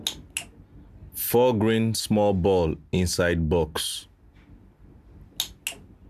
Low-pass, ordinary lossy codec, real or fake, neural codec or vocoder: 14.4 kHz; AAC, 96 kbps; real; none